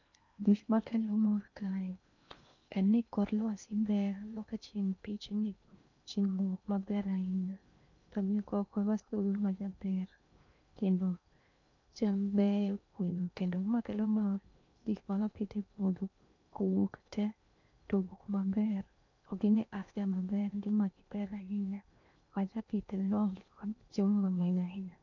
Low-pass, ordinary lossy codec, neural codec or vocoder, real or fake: 7.2 kHz; none; codec, 16 kHz in and 24 kHz out, 0.8 kbps, FocalCodec, streaming, 65536 codes; fake